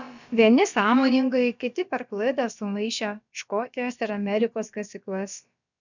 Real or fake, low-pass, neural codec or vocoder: fake; 7.2 kHz; codec, 16 kHz, about 1 kbps, DyCAST, with the encoder's durations